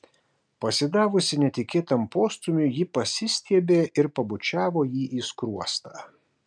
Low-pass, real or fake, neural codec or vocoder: 9.9 kHz; real; none